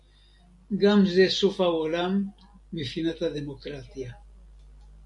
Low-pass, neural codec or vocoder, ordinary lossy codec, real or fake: 10.8 kHz; none; AAC, 64 kbps; real